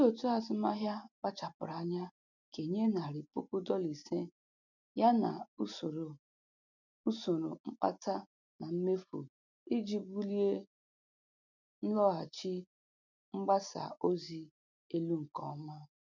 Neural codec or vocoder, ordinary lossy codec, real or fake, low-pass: none; none; real; 7.2 kHz